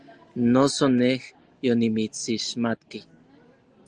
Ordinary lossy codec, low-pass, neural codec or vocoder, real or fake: Opus, 24 kbps; 9.9 kHz; none; real